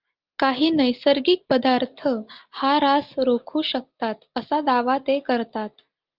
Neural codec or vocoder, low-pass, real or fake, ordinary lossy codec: none; 5.4 kHz; real; Opus, 32 kbps